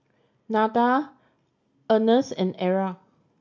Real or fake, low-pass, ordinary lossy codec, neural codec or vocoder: real; 7.2 kHz; none; none